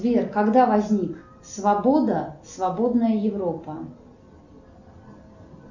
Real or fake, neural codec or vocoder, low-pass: real; none; 7.2 kHz